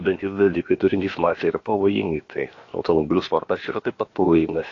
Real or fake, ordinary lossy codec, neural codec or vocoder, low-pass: fake; AAC, 32 kbps; codec, 16 kHz, about 1 kbps, DyCAST, with the encoder's durations; 7.2 kHz